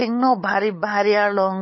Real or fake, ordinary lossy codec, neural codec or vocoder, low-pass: fake; MP3, 24 kbps; codec, 16 kHz, 8 kbps, FunCodec, trained on LibriTTS, 25 frames a second; 7.2 kHz